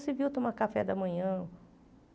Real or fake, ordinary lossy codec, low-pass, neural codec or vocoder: real; none; none; none